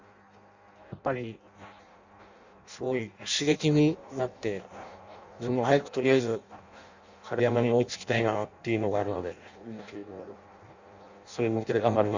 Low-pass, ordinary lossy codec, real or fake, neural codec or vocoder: 7.2 kHz; Opus, 64 kbps; fake; codec, 16 kHz in and 24 kHz out, 0.6 kbps, FireRedTTS-2 codec